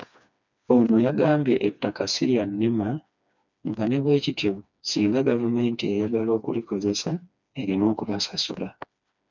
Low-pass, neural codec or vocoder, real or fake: 7.2 kHz; codec, 16 kHz, 2 kbps, FreqCodec, smaller model; fake